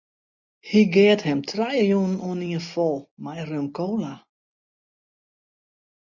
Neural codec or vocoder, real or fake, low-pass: none; real; 7.2 kHz